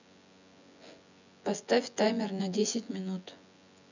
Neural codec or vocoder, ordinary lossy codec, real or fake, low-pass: vocoder, 24 kHz, 100 mel bands, Vocos; none; fake; 7.2 kHz